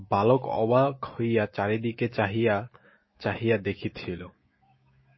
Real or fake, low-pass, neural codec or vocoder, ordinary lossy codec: fake; 7.2 kHz; autoencoder, 48 kHz, 128 numbers a frame, DAC-VAE, trained on Japanese speech; MP3, 24 kbps